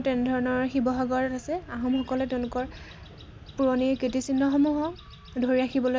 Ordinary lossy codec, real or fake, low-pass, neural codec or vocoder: none; real; none; none